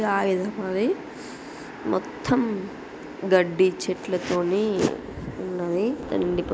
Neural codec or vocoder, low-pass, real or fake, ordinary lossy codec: none; none; real; none